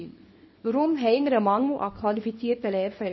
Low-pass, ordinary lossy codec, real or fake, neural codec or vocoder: 7.2 kHz; MP3, 24 kbps; fake; codec, 24 kHz, 0.9 kbps, WavTokenizer, small release